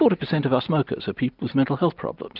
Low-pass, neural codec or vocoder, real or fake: 5.4 kHz; none; real